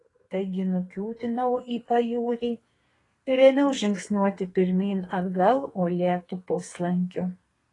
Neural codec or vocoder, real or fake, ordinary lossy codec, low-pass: codec, 32 kHz, 1.9 kbps, SNAC; fake; AAC, 32 kbps; 10.8 kHz